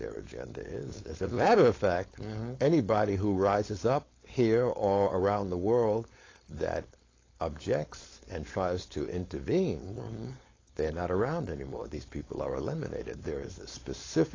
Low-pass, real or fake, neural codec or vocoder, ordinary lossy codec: 7.2 kHz; fake; codec, 16 kHz, 4.8 kbps, FACodec; AAC, 32 kbps